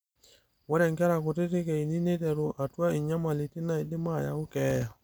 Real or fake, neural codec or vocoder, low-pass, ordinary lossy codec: real; none; none; none